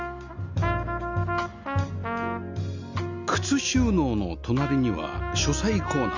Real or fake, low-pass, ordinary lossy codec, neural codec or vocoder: real; 7.2 kHz; none; none